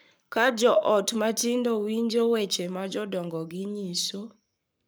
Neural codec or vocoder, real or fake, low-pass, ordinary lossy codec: codec, 44.1 kHz, 7.8 kbps, Pupu-Codec; fake; none; none